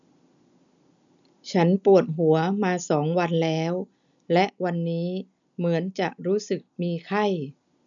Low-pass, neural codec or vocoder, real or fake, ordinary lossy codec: 7.2 kHz; none; real; none